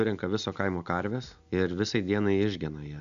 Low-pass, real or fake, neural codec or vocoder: 7.2 kHz; real; none